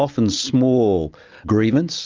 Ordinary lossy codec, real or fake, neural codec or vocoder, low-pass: Opus, 24 kbps; real; none; 7.2 kHz